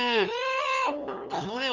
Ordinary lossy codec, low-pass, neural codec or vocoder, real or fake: none; 7.2 kHz; codec, 16 kHz, 2 kbps, FunCodec, trained on LibriTTS, 25 frames a second; fake